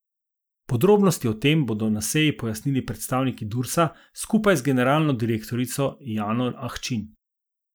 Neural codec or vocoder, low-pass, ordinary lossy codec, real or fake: none; none; none; real